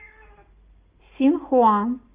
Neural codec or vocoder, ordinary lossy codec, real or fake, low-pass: none; Opus, 32 kbps; real; 3.6 kHz